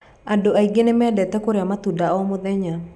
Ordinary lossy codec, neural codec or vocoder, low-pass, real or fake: none; none; none; real